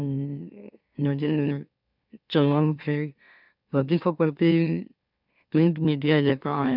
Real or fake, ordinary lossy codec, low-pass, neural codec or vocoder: fake; none; 5.4 kHz; autoencoder, 44.1 kHz, a latent of 192 numbers a frame, MeloTTS